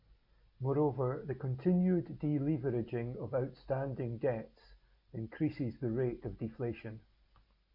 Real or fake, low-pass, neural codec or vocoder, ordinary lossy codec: real; 5.4 kHz; none; MP3, 32 kbps